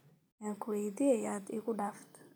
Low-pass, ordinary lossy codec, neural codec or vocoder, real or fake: none; none; none; real